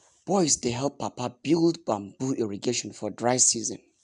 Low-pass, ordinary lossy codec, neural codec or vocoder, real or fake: 10.8 kHz; MP3, 96 kbps; none; real